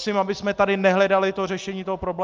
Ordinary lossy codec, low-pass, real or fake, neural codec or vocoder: Opus, 24 kbps; 7.2 kHz; real; none